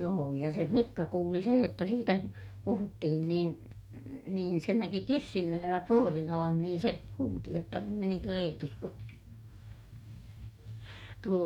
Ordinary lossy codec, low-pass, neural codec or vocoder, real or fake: none; 19.8 kHz; codec, 44.1 kHz, 2.6 kbps, DAC; fake